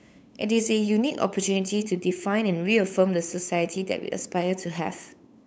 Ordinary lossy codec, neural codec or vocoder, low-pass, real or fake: none; codec, 16 kHz, 8 kbps, FunCodec, trained on LibriTTS, 25 frames a second; none; fake